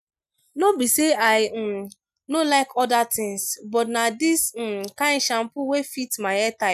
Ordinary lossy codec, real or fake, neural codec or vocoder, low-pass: none; real; none; 14.4 kHz